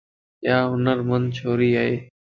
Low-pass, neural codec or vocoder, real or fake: 7.2 kHz; none; real